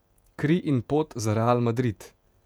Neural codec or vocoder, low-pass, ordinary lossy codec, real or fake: vocoder, 48 kHz, 128 mel bands, Vocos; 19.8 kHz; none; fake